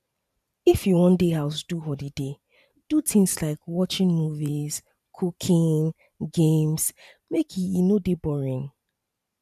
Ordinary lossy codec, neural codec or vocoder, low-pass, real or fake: none; vocoder, 44.1 kHz, 128 mel bands every 512 samples, BigVGAN v2; 14.4 kHz; fake